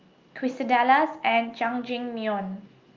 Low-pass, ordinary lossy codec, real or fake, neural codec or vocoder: 7.2 kHz; Opus, 24 kbps; real; none